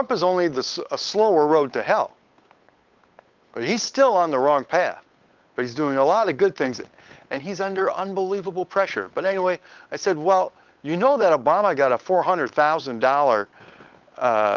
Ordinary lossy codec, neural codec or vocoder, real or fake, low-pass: Opus, 32 kbps; codec, 16 kHz, 8 kbps, FunCodec, trained on Chinese and English, 25 frames a second; fake; 7.2 kHz